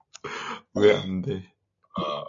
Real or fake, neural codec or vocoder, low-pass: real; none; 7.2 kHz